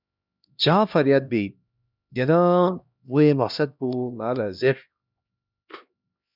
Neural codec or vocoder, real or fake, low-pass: codec, 16 kHz, 1 kbps, X-Codec, HuBERT features, trained on LibriSpeech; fake; 5.4 kHz